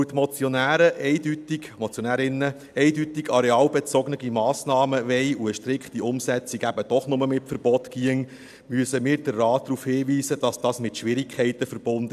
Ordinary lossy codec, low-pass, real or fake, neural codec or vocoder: AAC, 96 kbps; 14.4 kHz; real; none